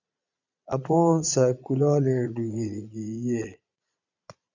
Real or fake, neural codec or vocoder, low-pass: fake; vocoder, 22.05 kHz, 80 mel bands, Vocos; 7.2 kHz